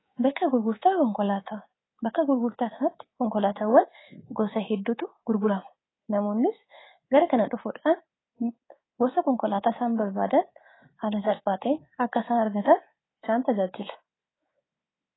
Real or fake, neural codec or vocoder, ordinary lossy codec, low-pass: fake; codec, 24 kHz, 3.1 kbps, DualCodec; AAC, 16 kbps; 7.2 kHz